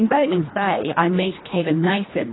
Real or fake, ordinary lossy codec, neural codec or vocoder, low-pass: fake; AAC, 16 kbps; codec, 24 kHz, 1.5 kbps, HILCodec; 7.2 kHz